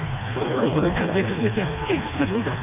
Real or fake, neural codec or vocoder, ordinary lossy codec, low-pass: fake; codec, 16 kHz, 2 kbps, FreqCodec, smaller model; none; 3.6 kHz